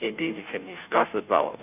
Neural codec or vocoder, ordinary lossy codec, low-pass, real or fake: codec, 16 kHz, 0.5 kbps, FunCodec, trained on Chinese and English, 25 frames a second; none; 3.6 kHz; fake